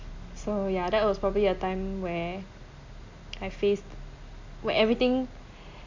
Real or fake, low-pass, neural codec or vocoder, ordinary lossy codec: real; 7.2 kHz; none; MP3, 64 kbps